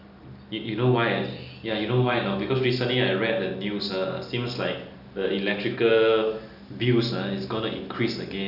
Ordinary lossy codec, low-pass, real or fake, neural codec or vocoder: none; 5.4 kHz; real; none